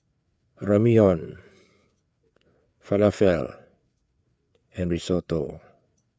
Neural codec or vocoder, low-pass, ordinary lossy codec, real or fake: codec, 16 kHz, 4 kbps, FreqCodec, larger model; none; none; fake